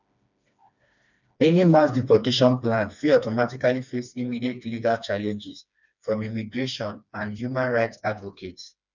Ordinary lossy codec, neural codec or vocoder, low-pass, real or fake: none; codec, 16 kHz, 2 kbps, FreqCodec, smaller model; 7.2 kHz; fake